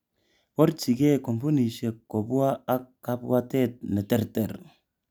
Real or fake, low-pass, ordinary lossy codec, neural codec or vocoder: real; none; none; none